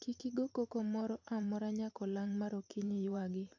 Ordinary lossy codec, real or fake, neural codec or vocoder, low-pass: AAC, 48 kbps; fake; vocoder, 44.1 kHz, 128 mel bands every 512 samples, BigVGAN v2; 7.2 kHz